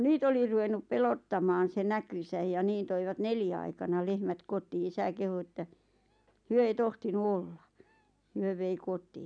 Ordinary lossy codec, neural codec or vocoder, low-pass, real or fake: none; none; 9.9 kHz; real